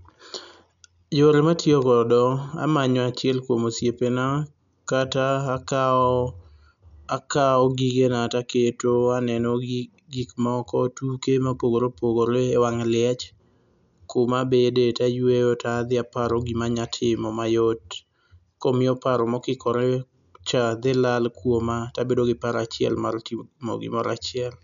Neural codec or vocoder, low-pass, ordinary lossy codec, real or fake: none; 7.2 kHz; none; real